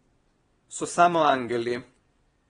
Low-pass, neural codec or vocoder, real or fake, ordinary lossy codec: 9.9 kHz; vocoder, 22.05 kHz, 80 mel bands, WaveNeXt; fake; AAC, 32 kbps